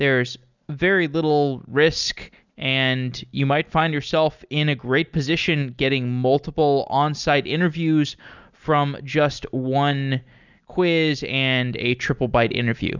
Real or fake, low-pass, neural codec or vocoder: real; 7.2 kHz; none